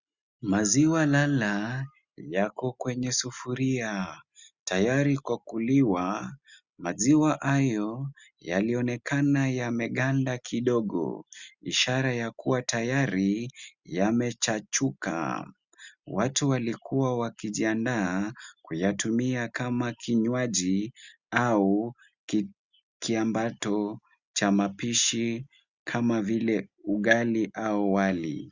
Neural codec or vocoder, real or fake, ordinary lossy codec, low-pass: none; real; Opus, 64 kbps; 7.2 kHz